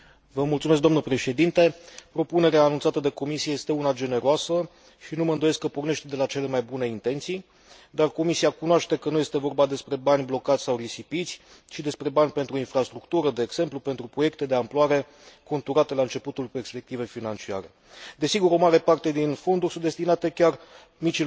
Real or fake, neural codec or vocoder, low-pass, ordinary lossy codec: real; none; none; none